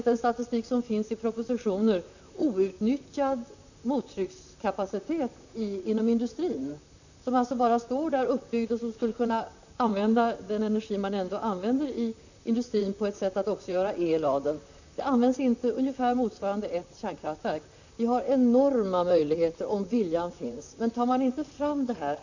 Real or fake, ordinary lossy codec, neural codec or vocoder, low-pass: fake; none; vocoder, 44.1 kHz, 128 mel bands, Pupu-Vocoder; 7.2 kHz